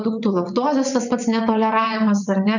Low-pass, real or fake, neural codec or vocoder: 7.2 kHz; fake; vocoder, 22.05 kHz, 80 mel bands, WaveNeXt